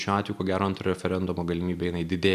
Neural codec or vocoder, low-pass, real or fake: none; 14.4 kHz; real